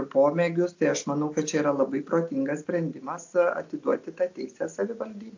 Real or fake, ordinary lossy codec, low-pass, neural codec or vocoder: real; AAC, 48 kbps; 7.2 kHz; none